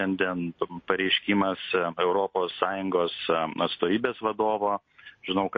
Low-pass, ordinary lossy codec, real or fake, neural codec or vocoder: 7.2 kHz; MP3, 32 kbps; real; none